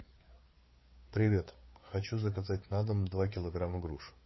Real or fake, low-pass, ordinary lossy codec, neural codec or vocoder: fake; 7.2 kHz; MP3, 24 kbps; codec, 16 kHz, 4 kbps, FreqCodec, larger model